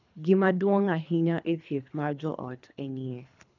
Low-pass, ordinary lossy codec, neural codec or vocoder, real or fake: 7.2 kHz; none; codec, 24 kHz, 3 kbps, HILCodec; fake